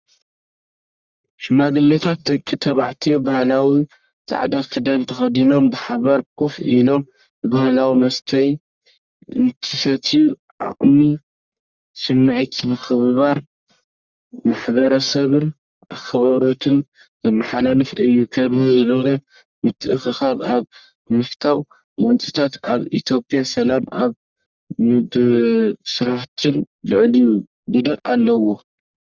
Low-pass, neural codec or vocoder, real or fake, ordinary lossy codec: 7.2 kHz; codec, 44.1 kHz, 1.7 kbps, Pupu-Codec; fake; Opus, 64 kbps